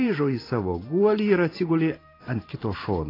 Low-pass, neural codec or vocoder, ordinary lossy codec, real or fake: 5.4 kHz; vocoder, 44.1 kHz, 128 mel bands every 512 samples, BigVGAN v2; AAC, 24 kbps; fake